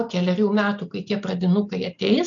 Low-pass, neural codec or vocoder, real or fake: 7.2 kHz; none; real